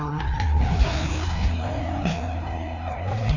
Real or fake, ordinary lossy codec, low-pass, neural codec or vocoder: fake; none; 7.2 kHz; codec, 16 kHz, 2 kbps, FreqCodec, larger model